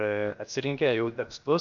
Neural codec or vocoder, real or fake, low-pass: codec, 16 kHz, 0.7 kbps, FocalCodec; fake; 7.2 kHz